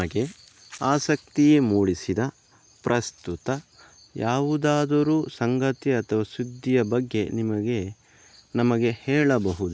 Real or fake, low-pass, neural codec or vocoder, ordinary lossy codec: real; none; none; none